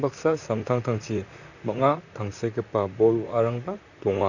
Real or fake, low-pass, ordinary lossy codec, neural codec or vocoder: fake; 7.2 kHz; none; vocoder, 44.1 kHz, 128 mel bands, Pupu-Vocoder